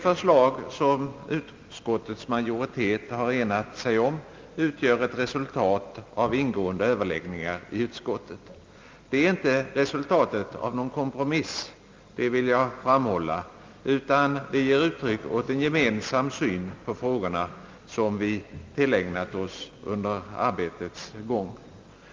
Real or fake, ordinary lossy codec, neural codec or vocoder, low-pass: real; Opus, 32 kbps; none; 7.2 kHz